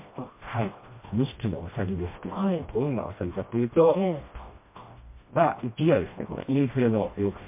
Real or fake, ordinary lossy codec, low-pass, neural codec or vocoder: fake; MP3, 24 kbps; 3.6 kHz; codec, 16 kHz, 1 kbps, FreqCodec, smaller model